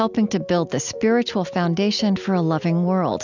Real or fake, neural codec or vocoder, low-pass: real; none; 7.2 kHz